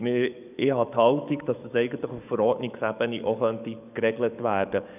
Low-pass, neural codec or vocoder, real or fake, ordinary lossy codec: 3.6 kHz; codec, 44.1 kHz, 7.8 kbps, DAC; fake; none